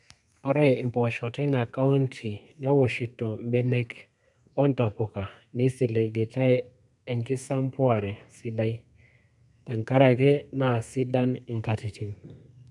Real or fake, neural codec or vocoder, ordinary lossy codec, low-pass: fake; codec, 32 kHz, 1.9 kbps, SNAC; none; 10.8 kHz